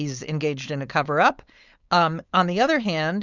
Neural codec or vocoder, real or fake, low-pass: codec, 16 kHz, 4.8 kbps, FACodec; fake; 7.2 kHz